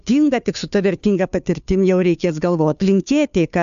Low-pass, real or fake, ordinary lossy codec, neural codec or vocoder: 7.2 kHz; fake; AAC, 96 kbps; codec, 16 kHz, 2 kbps, FunCodec, trained on Chinese and English, 25 frames a second